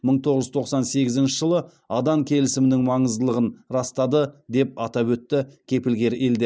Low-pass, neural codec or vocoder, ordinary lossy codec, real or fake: none; none; none; real